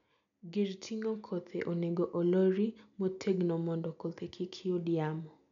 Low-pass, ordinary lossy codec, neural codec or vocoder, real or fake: 7.2 kHz; none; none; real